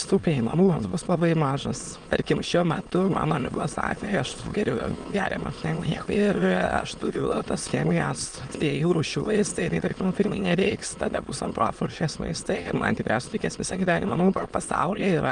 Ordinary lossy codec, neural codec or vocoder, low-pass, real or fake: Opus, 32 kbps; autoencoder, 22.05 kHz, a latent of 192 numbers a frame, VITS, trained on many speakers; 9.9 kHz; fake